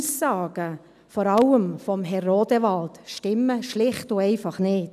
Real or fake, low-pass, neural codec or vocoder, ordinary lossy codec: real; 14.4 kHz; none; none